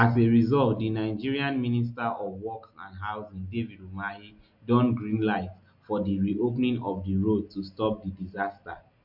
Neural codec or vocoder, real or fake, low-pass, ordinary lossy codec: none; real; 5.4 kHz; MP3, 48 kbps